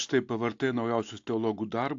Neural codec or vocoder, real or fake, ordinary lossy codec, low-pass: none; real; AAC, 48 kbps; 7.2 kHz